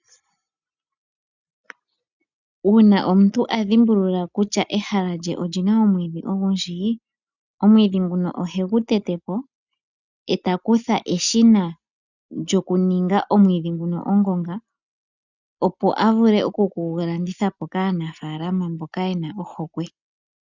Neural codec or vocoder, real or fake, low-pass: none; real; 7.2 kHz